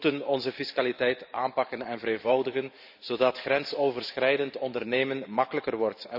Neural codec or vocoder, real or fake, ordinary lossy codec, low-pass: vocoder, 44.1 kHz, 128 mel bands every 512 samples, BigVGAN v2; fake; none; 5.4 kHz